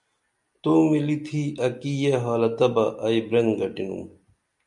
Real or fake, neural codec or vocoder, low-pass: real; none; 10.8 kHz